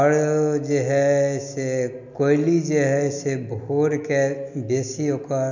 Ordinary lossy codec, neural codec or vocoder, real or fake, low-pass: none; none; real; 7.2 kHz